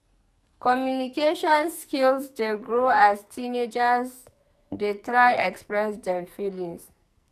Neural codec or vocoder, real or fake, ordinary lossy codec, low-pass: codec, 44.1 kHz, 2.6 kbps, SNAC; fake; none; 14.4 kHz